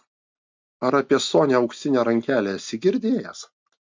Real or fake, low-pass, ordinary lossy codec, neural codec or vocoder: real; 7.2 kHz; MP3, 64 kbps; none